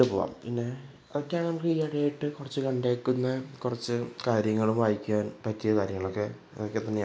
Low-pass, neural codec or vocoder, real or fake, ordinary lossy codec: none; none; real; none